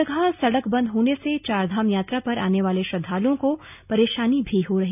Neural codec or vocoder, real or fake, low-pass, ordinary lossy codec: none; real; 3.6 kHz; none